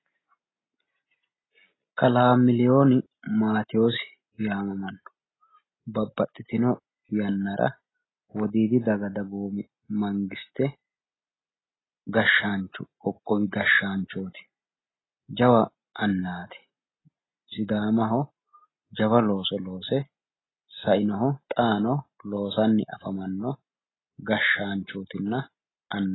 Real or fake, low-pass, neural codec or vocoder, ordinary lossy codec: real; 7.2 kHz; none; AAC, 16 kbps